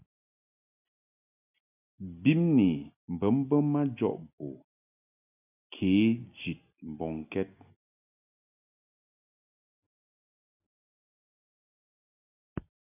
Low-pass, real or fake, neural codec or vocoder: 3.6 kHz; real; none